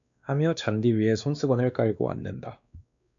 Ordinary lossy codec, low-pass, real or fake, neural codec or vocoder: MP3, 96 kbps; 7.2 kHz; fake; codec, 16 kHz, 2 kbps, X-Codec, WavLM features, trained on Multilingual LibriSpeech